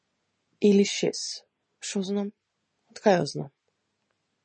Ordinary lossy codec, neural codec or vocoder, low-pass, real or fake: MP3, 32 kbps; none; 9.9 kHz; real